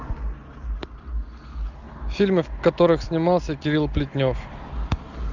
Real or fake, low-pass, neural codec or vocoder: real; 7.2 kHz; none